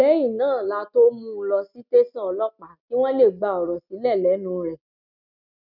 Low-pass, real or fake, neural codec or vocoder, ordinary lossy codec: 5.4 kHz; real; none; none